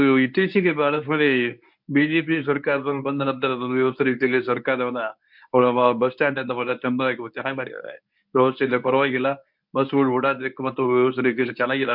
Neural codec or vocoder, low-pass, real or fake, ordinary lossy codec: codec, 24 kHz, 0.9 kbps, WavTokenizer, medium speech release version 1; 5.4 kHz; fake; MP3, 48 kbps